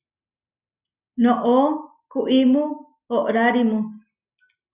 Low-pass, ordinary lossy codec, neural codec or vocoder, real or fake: 3.6 kHz; Opus, 64 kbps; none; real